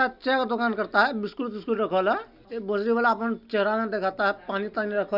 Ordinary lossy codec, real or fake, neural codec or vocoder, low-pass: none; real; none; 5.4 kHz